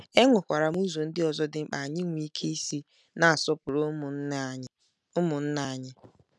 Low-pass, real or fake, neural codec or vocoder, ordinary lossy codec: none; real; none; none